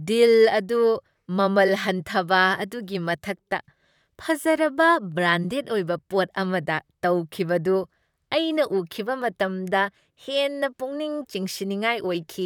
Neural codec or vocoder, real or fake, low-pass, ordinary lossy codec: vocoder, 44.1 kHz, 128 mel bands, Pupu-Vocoder; fake; 19.8 kHz; none